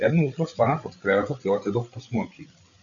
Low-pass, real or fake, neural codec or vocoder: 7.2 kHz; fake; codec, 16 kHz, 8 kbps, FreqCodec, larger model